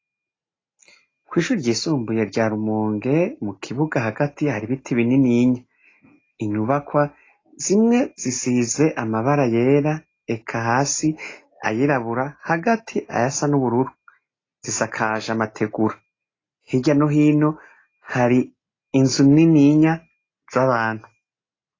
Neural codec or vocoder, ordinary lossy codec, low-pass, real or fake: none; AAC, 32 kbps; 7.2 kHz; real